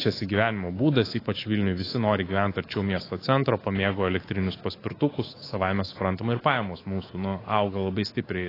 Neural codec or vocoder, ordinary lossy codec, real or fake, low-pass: codec, 24 kHz, 3.1 kbps, DualCodec; AAC, 24 kbps; fake; 5.4 kHz